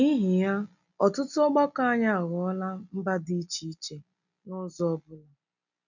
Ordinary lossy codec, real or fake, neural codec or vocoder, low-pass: none; real; none; 7.2 kHz